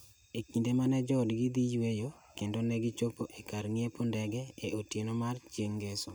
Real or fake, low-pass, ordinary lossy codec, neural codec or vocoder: real; none; none; none